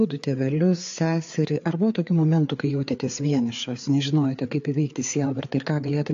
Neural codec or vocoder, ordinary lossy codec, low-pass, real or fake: codec, 16 kHz, 4 kbps, FreqCodec, larger model; AAC, 48 kbps; 7.2 kHz; fake